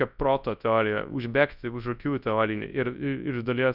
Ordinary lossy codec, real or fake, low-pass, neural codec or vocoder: Opus, 64 kbps; fake; 5.4 kHz; codec, 24 kHz, 0.9 kbps, WavTokenizer, large speech release